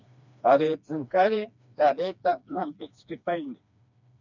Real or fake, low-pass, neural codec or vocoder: fake; 7.2 kHz; codec, 16 kHz, 2 kbps, FreqCodec, smaller model